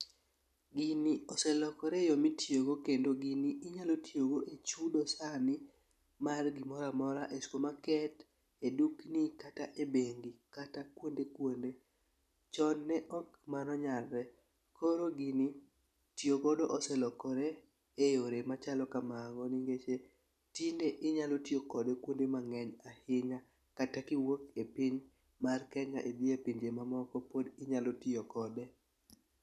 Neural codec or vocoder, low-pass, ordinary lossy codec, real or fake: none; 14.4 kHz; none; real